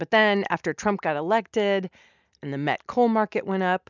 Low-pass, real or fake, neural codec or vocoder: 7.2 kHz; real; none